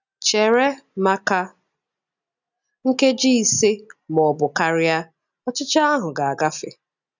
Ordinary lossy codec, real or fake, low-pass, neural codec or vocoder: none; real; 7.2 kHz; none